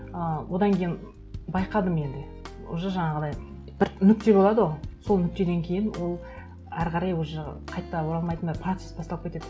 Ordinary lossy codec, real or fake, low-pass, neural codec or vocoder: none; real; none; none